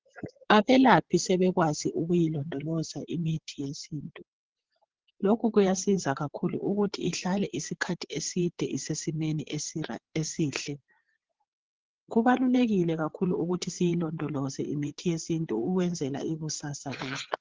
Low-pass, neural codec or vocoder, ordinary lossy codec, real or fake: 7.2 kHz; vocoder, 22.05 kHz, 80 mel bands, WaveNeXt; Opus, 16 kbps; fake